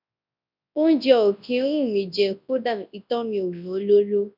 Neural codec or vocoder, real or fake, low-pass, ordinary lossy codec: codec, 24 kHz, 0.9 kbps, WavTokenizer, large speech release; fake; 5.4 kHz; none